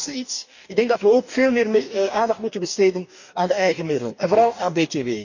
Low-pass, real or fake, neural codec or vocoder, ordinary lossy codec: 7.2 kHz; fake; codec, 44.1 kHz, 2.6 kbps, DAC; none